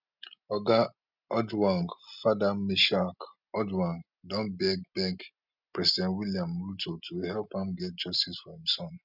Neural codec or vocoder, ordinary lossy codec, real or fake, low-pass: none; none; real; 5.4 kHz